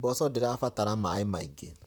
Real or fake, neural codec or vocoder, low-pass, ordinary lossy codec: fake; vocoder, 44.1 kHz, 128 mel bands, Pupu-Vocoder; none; none